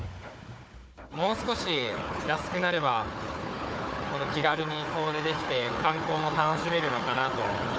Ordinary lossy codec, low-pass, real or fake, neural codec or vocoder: none; none; fake; codec, 16 kHz, 4 kbps, FunCodec, trained on Chinese and English, 50 frames a second